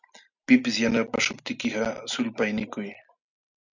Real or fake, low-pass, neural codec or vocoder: real; 7.2 kHz; none